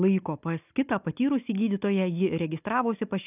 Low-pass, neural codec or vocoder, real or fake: 3.6 kHz; none; real